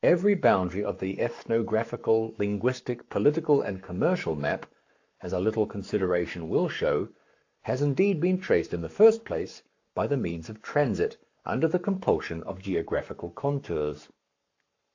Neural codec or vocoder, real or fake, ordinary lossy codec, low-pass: codec, 44.1 kHz, 7.8 kbps, Pupu-Codec; fake; AAC, 48 kbps; 7.2 kHz